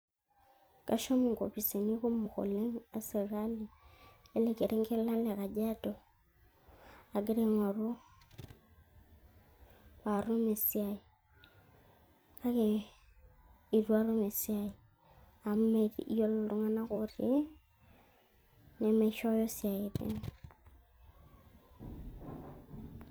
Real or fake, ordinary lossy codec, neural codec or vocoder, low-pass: fake; none; vocoder, 44.1 kHz, 128 mel bands every 256 samples, BigVGAN v2; none